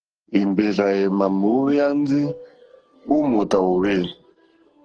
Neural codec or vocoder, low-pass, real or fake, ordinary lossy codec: codec, 44.1 kHz, 2.6 kbps, SNAC; 9.9 kHz; fake; Opus, 16 kbps